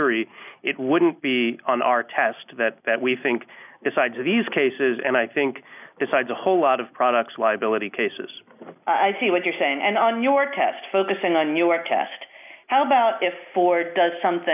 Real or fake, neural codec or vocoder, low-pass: real; none; 3.6 kHz